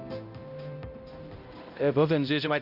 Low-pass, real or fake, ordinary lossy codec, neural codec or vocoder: 5.4 kHz; fake; none; codec, 16 kHz, 0.5 kbps, X-Codec, HuBERT features, trained on balanced general audio